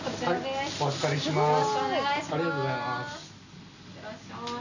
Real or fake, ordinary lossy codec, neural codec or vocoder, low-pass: real; none; none; 7.2 kHz